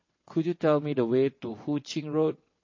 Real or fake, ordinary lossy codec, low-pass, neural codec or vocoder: fake; MP3, 32 kbps; 7.2 kHz; vocoder, 22.05 kHz, 80 mel bands, WaveNeXt